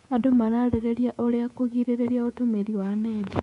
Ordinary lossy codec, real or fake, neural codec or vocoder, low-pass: none; fake; codec, 24 kHz, 3.1 kbps, DualCodec; 10.8 kHz